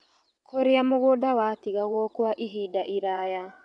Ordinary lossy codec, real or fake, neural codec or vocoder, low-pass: none; fake; vocoder, 22.05 kHz, 80 mel bands, WaveNeXt; none